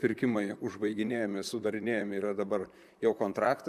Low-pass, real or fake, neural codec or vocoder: 14.4 kHz; fake; vocoder, 44.1 kHz, 128 mel bands, Pupu-Vocoder